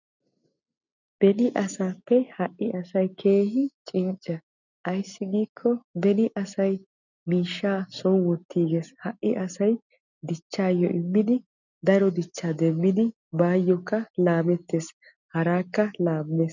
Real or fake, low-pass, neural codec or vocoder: real; 7.2 kHz; none